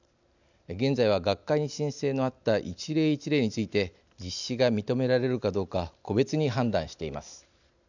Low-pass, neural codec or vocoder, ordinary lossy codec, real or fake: 7.2 kHz; none; none; real